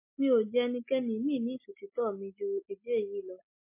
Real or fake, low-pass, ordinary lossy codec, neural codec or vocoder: real; 3.6 kHz; MP3, 24 kbps; none